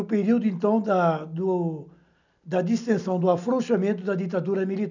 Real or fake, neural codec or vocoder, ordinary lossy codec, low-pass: real; none; none; 7.2 kHz